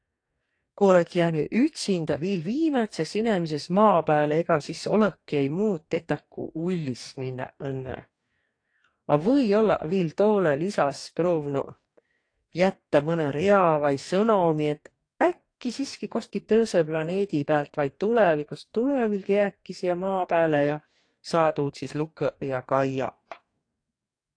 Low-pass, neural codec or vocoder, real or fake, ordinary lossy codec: 9.9 kHz; codec, 44.1 kHz, 2.6 kbps, DAC; fake; AAC, 48 kbps